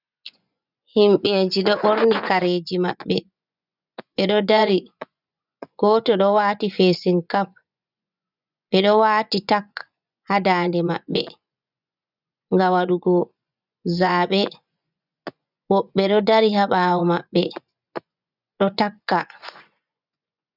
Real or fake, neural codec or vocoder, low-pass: fake; vocoder, 24 kHz, 100 mel bands, Vocos; 5.4 kHz